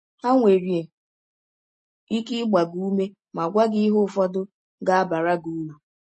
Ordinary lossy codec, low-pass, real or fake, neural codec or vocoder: MP3, 32 kbps; 10.8 kHz; real; none